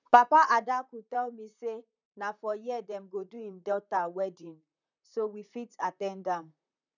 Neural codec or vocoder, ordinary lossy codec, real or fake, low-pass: vocoder, 44.1 kHz, 128 mel bands, Pupu-Vocoder; none; fake; 7.2 kHz